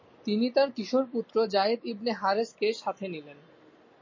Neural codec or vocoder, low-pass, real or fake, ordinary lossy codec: none; 7.2 kHz; real; MP3, 32 kbps